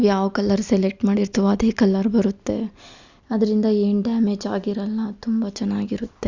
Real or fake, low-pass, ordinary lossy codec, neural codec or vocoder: real; 7.2 kHz; Opus, 64 kbps; none